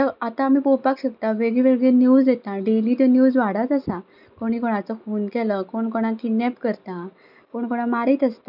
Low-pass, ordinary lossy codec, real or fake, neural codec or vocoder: 5.4 kHz; none; real; none